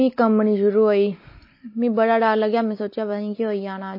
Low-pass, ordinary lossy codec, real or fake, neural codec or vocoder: 5.4 kHz; MP3, 24 kbps; real; none